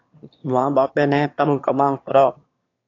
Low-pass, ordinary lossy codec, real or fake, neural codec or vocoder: 7.2 kHz; AAC, 48 kbps; fake; autoencoder, 22.05 kHz, a latent of 192 numbers a frame, VITS, trained on one speaker